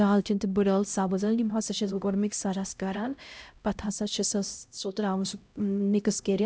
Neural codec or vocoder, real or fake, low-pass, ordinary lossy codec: codec, 16 kHz, 0.5 kbps, X-Codec, HuBERT features, trained on LibriSpeech; fake; none; none